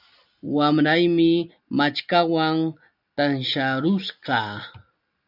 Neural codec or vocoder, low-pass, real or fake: none; 5.4 kHz; real